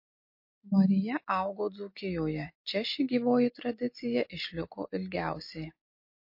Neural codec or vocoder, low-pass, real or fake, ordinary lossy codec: none; 5.4 kHz; real; MP3, 32 kbps